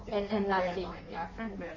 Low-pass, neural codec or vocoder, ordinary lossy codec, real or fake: 7.2 kHz; codec, 16 kHz in and 24 kHz out, 1.1 kbps, FireRedTTS-2 codec; MP3, 32 kbps; fake